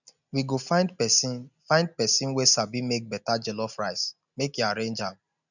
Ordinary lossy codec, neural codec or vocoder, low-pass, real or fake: none; none; 7.2 kHz; real